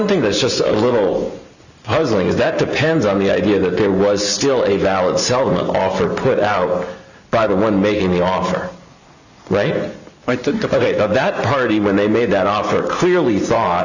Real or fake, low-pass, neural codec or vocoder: real; 7.2 kHz; none